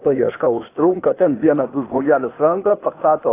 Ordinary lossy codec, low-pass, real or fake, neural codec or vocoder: AAC, 24 kbps; 3.6 kHz; fake; codec, 16 kHz, 0.8 kbps, ZipCodec